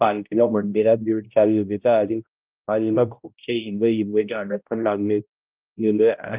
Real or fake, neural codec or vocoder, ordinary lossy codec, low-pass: fake; codec, 16 kHz, 0.5 kbps, X-Codec, HuBERT features, trained on balanced general audio; Opus, 32 kbps; 3.6 kHz